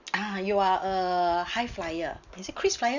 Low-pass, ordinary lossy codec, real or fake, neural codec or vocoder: 7.2 kHz; none; real; none